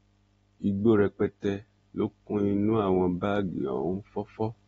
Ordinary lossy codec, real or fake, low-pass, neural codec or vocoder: AAC, 24 kbps; real; 19.8 kHz; none